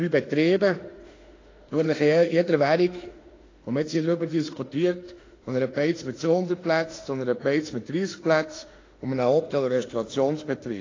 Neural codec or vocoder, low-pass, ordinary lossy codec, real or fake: autoencoder, 48 kHz, 32 numbers a frame, DAC-VAE, trained on Japanese speech; 7.2 kHz; AAC, 32 kbps; fake